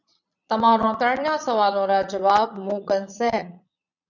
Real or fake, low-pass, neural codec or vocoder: fake; 7.2 kHz; vocoder, 22.05 kHz, 80 mel bands, Vocos